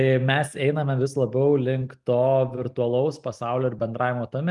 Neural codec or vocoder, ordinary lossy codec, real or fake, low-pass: none; Opus, 32 kbps; real; 10.8 kHz